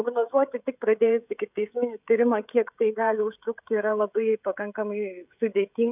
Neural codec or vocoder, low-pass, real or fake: codec, 16 kHz, 16 kbps, FreqCodec, smaller model; 3.6 kHz; fake